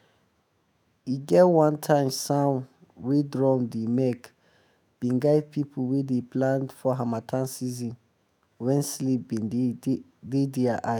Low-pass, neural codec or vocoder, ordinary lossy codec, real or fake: none; autoencoder, 48 kHz, 128 numbers a frame, DAC-VAE, trained on Japanese speech; none; fake